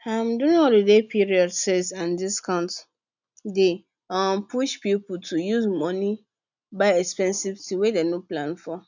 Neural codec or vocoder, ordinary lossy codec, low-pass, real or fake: none; none; 7.2 kHz; real